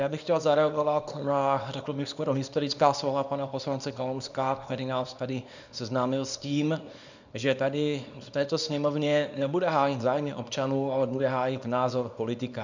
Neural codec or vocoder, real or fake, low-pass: codec, 24 kHz, 0.9 kbps, WavTokenizer, small release; fake; 7.2 kHz